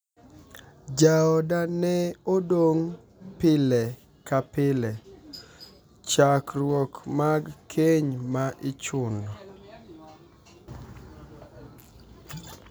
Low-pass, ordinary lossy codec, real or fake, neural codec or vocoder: none; none; real; none